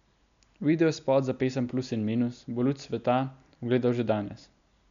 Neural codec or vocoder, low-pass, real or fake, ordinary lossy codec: none; 7.2 kHz; real; none